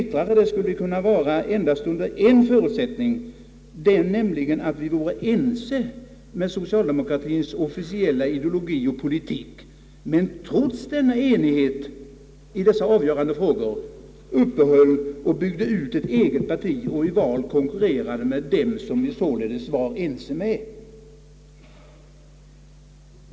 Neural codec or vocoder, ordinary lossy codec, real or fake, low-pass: none; none; real; none